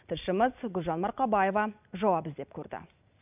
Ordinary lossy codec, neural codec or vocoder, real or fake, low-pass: none; none; real; 3.6 kHz